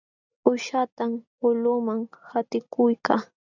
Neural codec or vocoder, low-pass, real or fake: none; 7.2 kHz; real